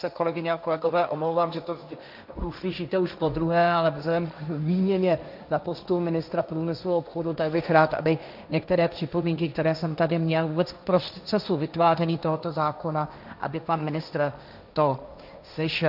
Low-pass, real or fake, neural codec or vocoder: 5.4 kHz; fake; codec, 16 kHz, 1.1 kbps, Voila-Tokenizer